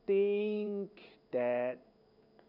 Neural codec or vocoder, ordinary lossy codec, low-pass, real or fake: codec, 16 kHz in and 24 kHz out, 1 kbps, XY-Tokenizer; none; 5.4 kHz; fake